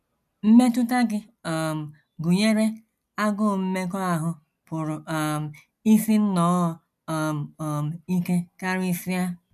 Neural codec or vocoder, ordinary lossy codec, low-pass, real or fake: none; none; 14.4 kHz; real